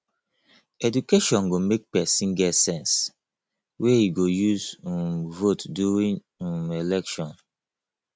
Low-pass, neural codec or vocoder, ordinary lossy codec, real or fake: none; none; none; real